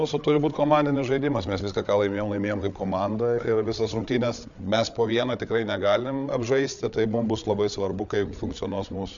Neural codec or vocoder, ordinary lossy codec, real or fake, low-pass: codec, 16 kHz, 8 kbps, FreqCodec, larger model; MP3, 96 kbps; fake; 7.2 kHz